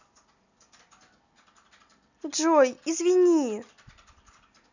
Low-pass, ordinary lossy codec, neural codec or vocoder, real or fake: 7.2 kHz; none; none; real